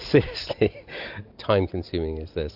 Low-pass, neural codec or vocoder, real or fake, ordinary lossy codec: 5.4 kHz; none; real; AAC, 48 kbps